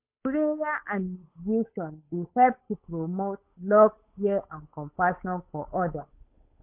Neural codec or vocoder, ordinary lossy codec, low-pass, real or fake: codec, 16 kHz, 8 kbps, FunCodec, trained on Chinese and English, 25 frames a second; MP3, 32 kbps; 3.6 kHz; fake